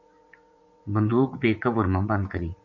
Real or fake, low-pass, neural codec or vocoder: fake; 7.2 kHz; vocoder, 44.1 kHz, 80 mel bands, Vocos